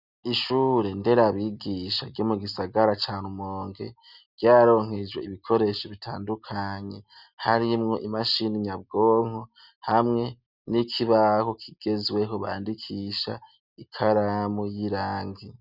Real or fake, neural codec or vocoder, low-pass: real; none; 5.4 kHz